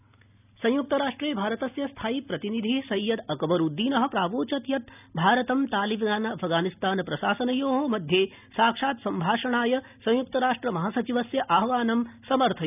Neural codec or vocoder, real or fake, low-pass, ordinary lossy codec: none; real; 3.6 kHz; none